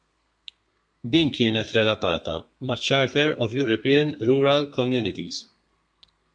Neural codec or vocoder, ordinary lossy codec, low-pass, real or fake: codec, 32 kHz, 1.9 kbps, SNAC; MP3, 64 kbps; 9.9 kHz; fake